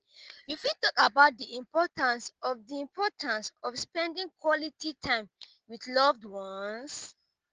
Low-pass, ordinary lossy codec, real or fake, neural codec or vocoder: 7.2 kHz; Opus, 16 kbps; real; none